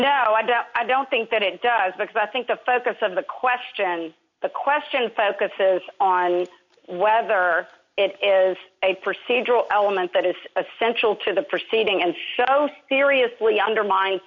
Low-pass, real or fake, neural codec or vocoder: 7.2 kHz; real; none